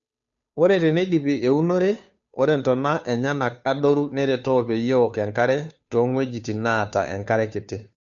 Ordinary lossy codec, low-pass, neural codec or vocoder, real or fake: none; 7.2 kHz; codec, 16 kHz, 2 kbps, FunCodec, trained on Chinese and English, 25 frames a second; fake